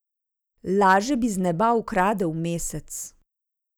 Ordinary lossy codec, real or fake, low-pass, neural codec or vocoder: none; real; none; none